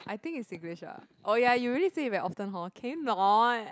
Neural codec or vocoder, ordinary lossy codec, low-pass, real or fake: none; none; none; real